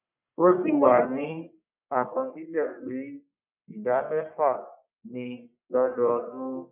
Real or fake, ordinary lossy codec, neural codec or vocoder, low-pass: fake; none; codec, 44.1 kHz, 1.7 kbps, Pupu-Codec; 3.6 kHz